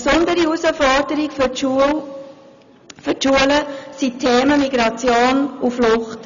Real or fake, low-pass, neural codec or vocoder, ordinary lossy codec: real; 7.2 kHz; none; none